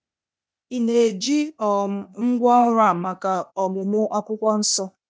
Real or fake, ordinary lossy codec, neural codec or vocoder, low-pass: fake; none; codec, 16 kHz, 0.8 kbps, ZipCodec; none